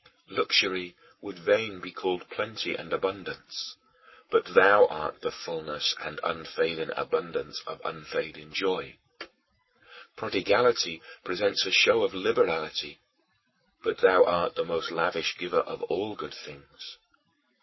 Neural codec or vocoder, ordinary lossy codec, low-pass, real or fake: codec, 44.1 kHz, 7.8 kbps, Pupu-Codec; MP3, 24 kbps; 7.2 kHz; fake